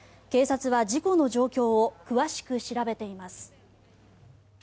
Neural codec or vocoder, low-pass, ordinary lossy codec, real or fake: none; none; none; real